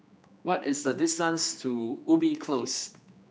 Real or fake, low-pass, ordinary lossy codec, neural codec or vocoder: fake; none; none; codec, 16 kHz, 2 kbps, X-Codec, HuBERT features, trained on general audio